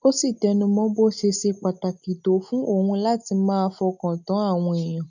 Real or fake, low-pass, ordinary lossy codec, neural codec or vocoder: real; 7.2 kHz; none; none